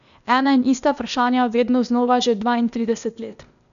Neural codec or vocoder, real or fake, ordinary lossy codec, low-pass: codec, 16 kHz, 0.8 kbps, ZipCodec; fake; MP3, 96 kbps; 7.2 kHz